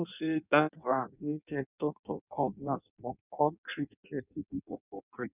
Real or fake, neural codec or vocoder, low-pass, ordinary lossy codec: fake; codec, 16 kHz in and 24 kHz out, 0.6 kbps, FireRedTTS-2 codec; 3.6 kHz; none